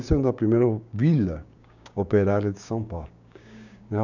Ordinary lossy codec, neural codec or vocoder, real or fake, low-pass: none; codec, 16 kHz, 6 kbps, DAC; fake; 7.2 kHz